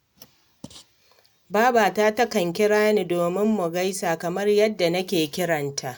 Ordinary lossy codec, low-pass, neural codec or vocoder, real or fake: none; none; none; real